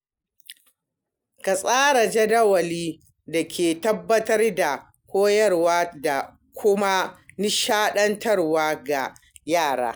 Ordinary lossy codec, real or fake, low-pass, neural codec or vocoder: none; real; none; none